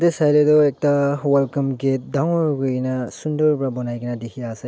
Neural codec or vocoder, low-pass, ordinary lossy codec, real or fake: none; none; none; real